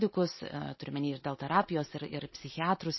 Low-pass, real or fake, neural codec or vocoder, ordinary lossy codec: 7.2 kHz; real; none; MP3, 24 kbps